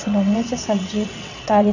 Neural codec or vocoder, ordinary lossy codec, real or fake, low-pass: vocoder, 22.05 kHz, 80 mel bands, WaveNeXt; none; fake; 7.2 kHz